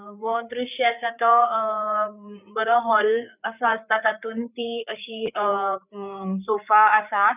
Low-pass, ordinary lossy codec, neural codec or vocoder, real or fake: 3.6 kHz; none; codec, 16 kHz, 4 kbps, FreqCodec, larger model; fake